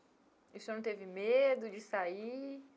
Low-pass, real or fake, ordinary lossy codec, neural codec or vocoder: none; real; none; none